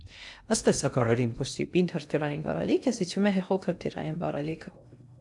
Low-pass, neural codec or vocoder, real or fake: 10.8 kHz; codec, 16 kHz in and 24 kHz out, 0.6 kbps, FocalCodec, streaming, 2048 codes; fake